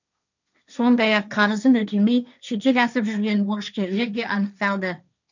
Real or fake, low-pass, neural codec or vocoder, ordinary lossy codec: fake; 7.2 kHz; codec, 16 kHz, 1.1 kbps, Voila-Tokenizer; none